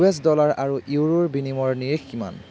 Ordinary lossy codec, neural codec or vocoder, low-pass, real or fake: none; none; none; real